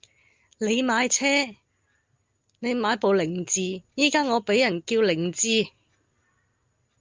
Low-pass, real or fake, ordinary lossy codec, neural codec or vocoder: 7.2 kHz; real; Opus, 24 kbps; none